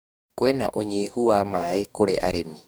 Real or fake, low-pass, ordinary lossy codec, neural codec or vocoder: fake; none; none; codec, 44.1 kHz, 2.6 kbps, DAC